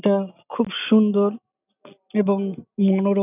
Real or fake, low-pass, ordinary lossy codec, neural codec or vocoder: real; 3.6 kHz; none; none